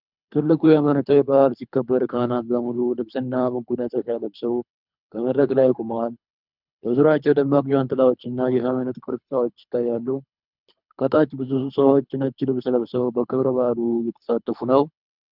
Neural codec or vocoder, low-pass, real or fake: codec, 24 kHz, 3 kbps, HILCodec; 5.4 kHz; fake